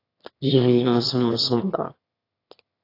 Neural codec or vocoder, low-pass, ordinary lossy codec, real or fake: autoencoder, 22.05 kHz, a latent of 192 numbers a frame, VITS, trained on one speaker; 5.4 kHz; AAC, 32 kbps; fake